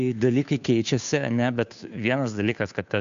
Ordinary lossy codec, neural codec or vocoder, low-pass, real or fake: MP3, 64 kbps; codec, 16 kHz, 2 kbps, FunCodec, trained on Chinese and English, 25 frames a second; 7.2 kHz; fake